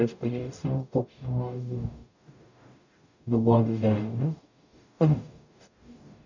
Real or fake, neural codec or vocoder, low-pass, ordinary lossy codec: fake; codec, 44.1 kHz, 0.9 kbps, DAC; 7.2 kHz; none